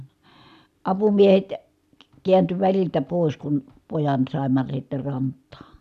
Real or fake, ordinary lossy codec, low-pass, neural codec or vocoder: real; none; 14.4 kHz; none